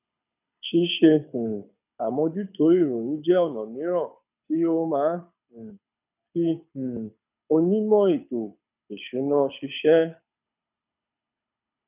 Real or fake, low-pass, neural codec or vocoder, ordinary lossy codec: fake; 3.6 kHz; codec, 24 kHz, 6 kbps, HILCodec; none